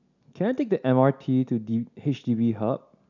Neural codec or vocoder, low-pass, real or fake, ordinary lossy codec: none; 7.2 kHz; real; none